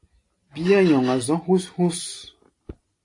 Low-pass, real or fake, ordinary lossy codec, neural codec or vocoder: 10.8 kHz; real; AAC, 48 kbps; none